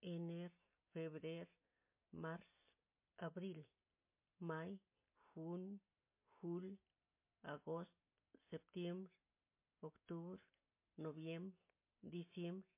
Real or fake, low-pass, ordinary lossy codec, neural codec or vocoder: real; 3.6 kHz; MP3, 24 kbps; none